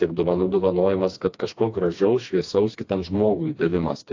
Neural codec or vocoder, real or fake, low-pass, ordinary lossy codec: codec, 16 kHz, 2 kbps, FreqCodec, smaller model; fake; 7.2 kHz; AAC, 48 kbps